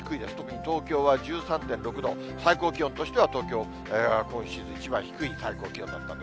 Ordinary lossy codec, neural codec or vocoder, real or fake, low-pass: none; none; real; none